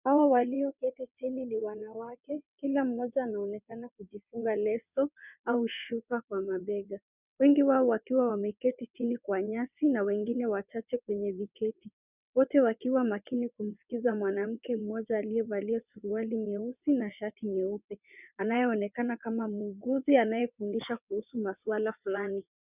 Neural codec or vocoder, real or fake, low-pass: vocoder, 22.05 kHz, 80 mel bands, WaveNeXt; fake; 3.6 kHz